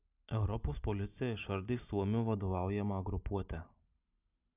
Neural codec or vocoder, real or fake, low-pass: none; real; 3.6 kHz